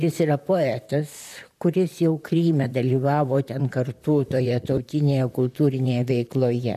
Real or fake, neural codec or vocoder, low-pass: fake; vocoder, 44.1 kHz, 128 mel bands, Pupu-Vocoder; 14.4 kHz